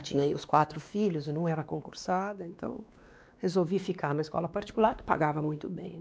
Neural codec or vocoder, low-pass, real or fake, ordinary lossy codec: codec, 16 kHz, 2 kbps, X-Codec, WavLM features, trained on Multilingual LibriSpeech; none; fake; none